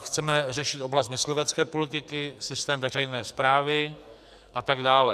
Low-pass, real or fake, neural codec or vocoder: 14.4 kHz; fake; codec, 44.1 kHz, 2.6 kbps, SNAC